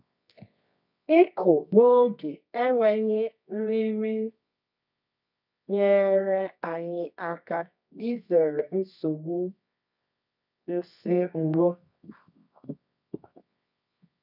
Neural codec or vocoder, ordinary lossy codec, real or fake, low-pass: codec, 24 kHz, 0.9 kbps, WavTokenizer, medium music audio release; none; fake; 5.4 kHz